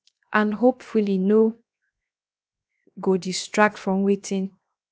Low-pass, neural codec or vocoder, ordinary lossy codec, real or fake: none; codec, 16 kHz, 0.7 kbps, FocalCodec; none; fake